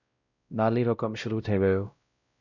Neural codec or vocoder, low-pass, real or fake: codec, 16 kHz, 0.5 kbps, X-Codec, WavLM features, trained on Multilingual LibriSpeech; 7.2 kHz; fake